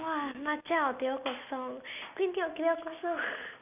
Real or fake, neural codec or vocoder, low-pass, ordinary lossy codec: real; none; 3.6 kHz; none